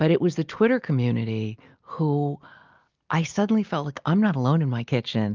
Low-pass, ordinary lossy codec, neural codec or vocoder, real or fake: 7.2 kHz; Opus, 32 kbps; none; real